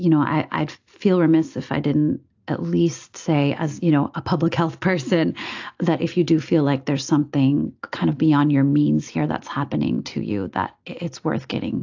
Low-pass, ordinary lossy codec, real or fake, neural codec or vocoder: 7.2 kHz; AAC, 48 kbps; real; none